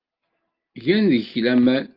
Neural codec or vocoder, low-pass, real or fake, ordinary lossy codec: none; 5.4 kHz; real; Opus, 32 kbps